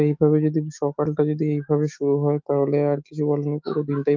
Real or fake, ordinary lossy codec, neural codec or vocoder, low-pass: fake; Opus, 24 kbps; autoencoder, 48 kHz, 128 numbers a frame, DAC-VAE, trained on Japanese speech; 7.2 kHz